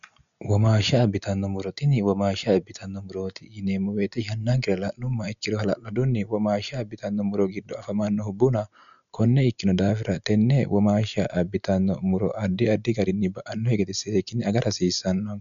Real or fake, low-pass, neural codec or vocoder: real; 7.2 kHz; none